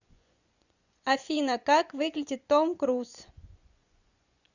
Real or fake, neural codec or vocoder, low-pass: fake; vocoder, 24 kHz, 100 mel bands, Vocos; 7.2 kHz